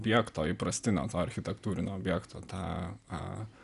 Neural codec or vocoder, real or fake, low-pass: none; real; 10.8 kHz